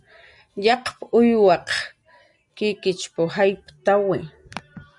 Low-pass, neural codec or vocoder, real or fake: 10.8 kHz; none; real